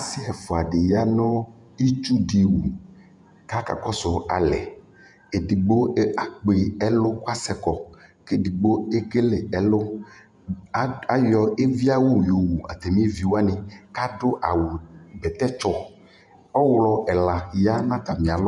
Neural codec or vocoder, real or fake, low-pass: vocoder, 48 kHz, 128 mel bands, Vocos; fake; 10.8 kHz